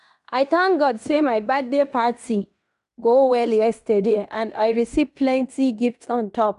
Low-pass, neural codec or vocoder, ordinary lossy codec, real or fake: 10.8 kHz; codec, 16 kHz in and 24 kHz out, 0.9 kbps, LongCat-Audio-Codec, fine tuned four codebook decoder; none; fake